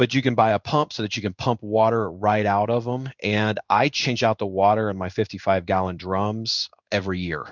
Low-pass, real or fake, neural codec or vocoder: 7.2 kHz; fake; codec, 16 kHz in and 24 kHz out, 1 kbps, XY-Tokenizer